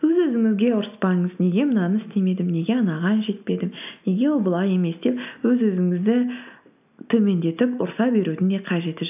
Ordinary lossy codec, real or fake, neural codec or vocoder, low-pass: AAC, 32 kbps; real; none; 3.6 kHz